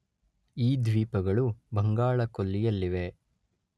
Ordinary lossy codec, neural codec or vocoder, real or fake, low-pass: none; none; real; none